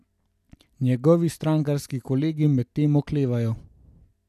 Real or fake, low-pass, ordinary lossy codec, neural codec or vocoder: real; 14.4 kHz; none; none